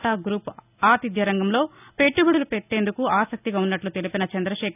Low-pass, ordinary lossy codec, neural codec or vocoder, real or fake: 3.6 kHz; none; none; real